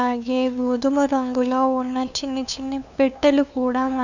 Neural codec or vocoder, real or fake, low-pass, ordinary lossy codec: codec, 16 kHz, 2 kbps, FunCodec, trained on LibriTTS, 25 frames a second; fake; 7.2 kHz; none